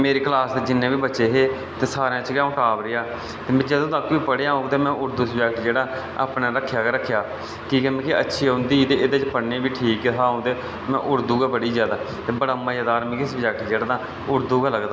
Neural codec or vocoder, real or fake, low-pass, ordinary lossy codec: none; real; none; none